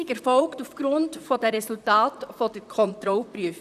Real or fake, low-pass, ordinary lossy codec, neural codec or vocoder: fake; 14.4 kHz; none; vocoder, 44.1 kHz, 128 mel bands, Pupu-Vocoder